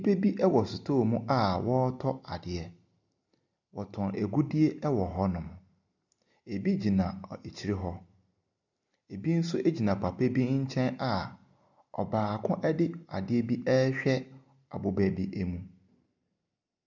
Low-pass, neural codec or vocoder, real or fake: 7.2 kHz; none; real